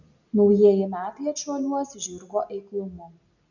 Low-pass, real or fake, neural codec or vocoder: 7.2 kHz; real; none